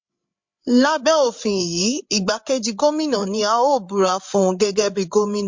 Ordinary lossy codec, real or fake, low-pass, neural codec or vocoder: MP3, 48 kbps; fake; 7.2 kHz; codec, 16 kHz, 8 kbps, FreqCodec, larger model